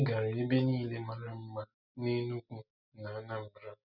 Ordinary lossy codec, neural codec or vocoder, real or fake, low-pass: none; none; real; 5.4 kHz